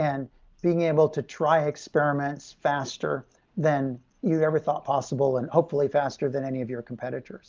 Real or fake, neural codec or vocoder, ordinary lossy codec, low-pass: real; none; Opus, 32 kbps; 7.2 kHz